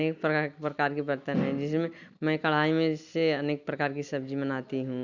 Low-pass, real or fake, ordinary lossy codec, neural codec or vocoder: 7.2 kHz; real; none; none